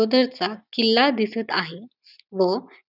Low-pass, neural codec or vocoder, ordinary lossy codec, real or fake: 5.4 kHz; none; none; real